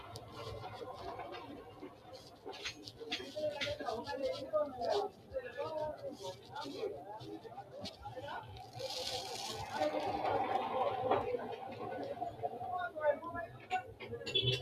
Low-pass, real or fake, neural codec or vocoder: 14.4 kHz; real; none